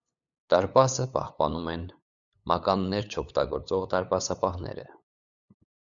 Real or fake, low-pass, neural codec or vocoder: fake; 7.2 kHz; codec, 16 kHz, 8 kbps, FunCodec, trained on LibriTTS, 25 frames a second